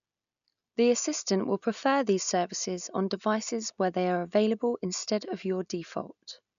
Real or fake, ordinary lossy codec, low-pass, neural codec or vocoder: real; none; 7.2 kHz; none